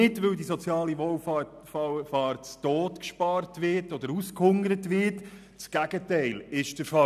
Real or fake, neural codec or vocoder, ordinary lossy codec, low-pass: real; none; none; 14.4 kHz